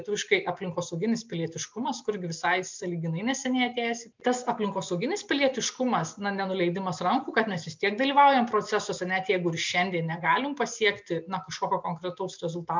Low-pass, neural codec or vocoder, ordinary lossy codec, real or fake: 7.2 kHz; none; MP3, 64 kbps; real